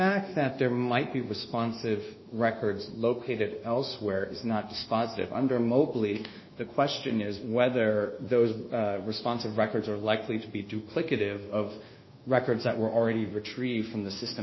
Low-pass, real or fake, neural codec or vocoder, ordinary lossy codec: 7.2 kHz; fake; codec, 24 kHz, 1.2 kbps, DualCodec; MP3, 24 kbps